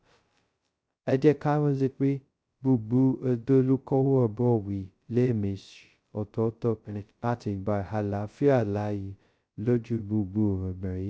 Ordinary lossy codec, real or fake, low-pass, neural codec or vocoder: none; fake; none; codec, 16 kHz, 0.2 kbps, FocalCodec